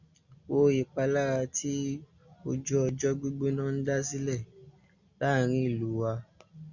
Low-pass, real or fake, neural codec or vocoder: 7.2 kHz; real; none